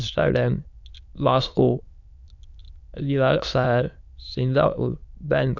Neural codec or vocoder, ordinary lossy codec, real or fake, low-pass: autoencoder, 22.05 kHz, a latent of 192 numbers a frame, VITS, trained on many speakers; none; fake; 7.2 kHz